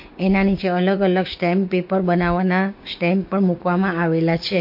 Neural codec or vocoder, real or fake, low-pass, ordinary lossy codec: codec, 16 kHz, 6 kbps, DAC; fake; 5.4 kHz; MP3, 32 kbps